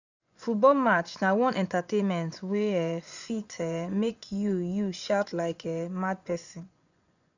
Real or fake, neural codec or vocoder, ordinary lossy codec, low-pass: fake; vocoder, 24 kHz, 100 mel bands, Vocos; none; 7.2 kHz